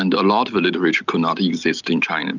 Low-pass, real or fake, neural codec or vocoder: 7.2 kHz; real; none